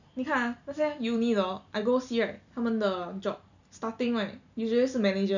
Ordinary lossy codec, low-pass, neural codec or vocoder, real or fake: none; 7.2 kHz; none; real